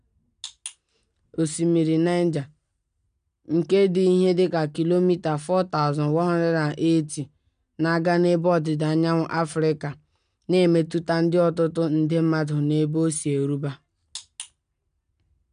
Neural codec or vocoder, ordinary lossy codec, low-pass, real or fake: none; none; 9.9 kHz; real